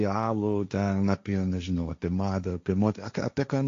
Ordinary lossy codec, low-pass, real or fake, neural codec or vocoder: AAC, 48 kbps; 7.2 kHz; fake; codec, 16 kHz, 1.1 kbps, Voila-Tokenizer